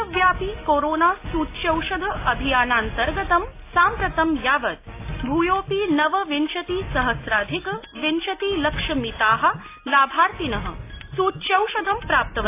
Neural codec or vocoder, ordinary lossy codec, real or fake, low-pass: none; AAC, 24 kbps; real; 3.6 kHz